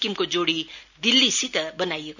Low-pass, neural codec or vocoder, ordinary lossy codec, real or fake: 7.2 kHz; none; none; real